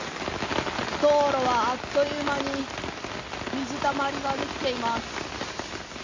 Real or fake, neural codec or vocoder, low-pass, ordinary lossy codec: real; none; 7.2 kHz; AAC, 32 kbps